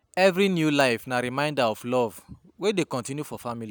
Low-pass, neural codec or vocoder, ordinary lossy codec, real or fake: none; none; none; real